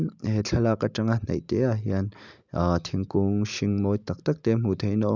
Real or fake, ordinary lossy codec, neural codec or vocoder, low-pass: real; none; none; 7.2 kHz